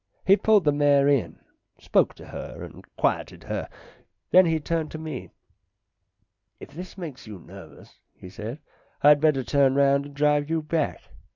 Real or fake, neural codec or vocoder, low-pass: real; none; 7.2 kHz